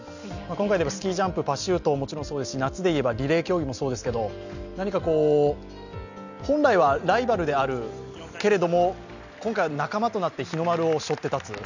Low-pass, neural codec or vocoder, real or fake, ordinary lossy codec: 7.2 kHz; none; real; none